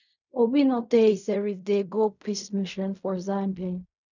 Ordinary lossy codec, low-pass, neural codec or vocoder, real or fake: none; 7.2 kHz; codec, 16 kHz in and 24 kHz out, 0.4 kbps, LongCat-Audio-Codec, fine tuned four codebook decoder; fake